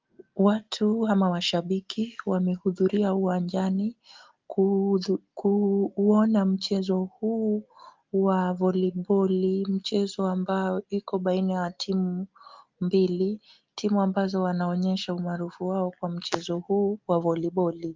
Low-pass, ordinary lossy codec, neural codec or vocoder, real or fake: 7.2 kHz; Opus, 32 kbps; none; real